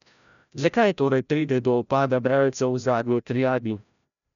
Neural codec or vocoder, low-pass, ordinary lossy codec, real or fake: codec, 16 kHz, 0.5 kbps, FreqCodec, larger model; 7.2 kHz; none; fake